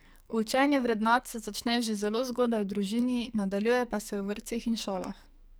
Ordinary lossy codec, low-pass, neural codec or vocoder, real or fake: none; none; codec, 44.1 kHz, 2.6 kbps, SNAC; fake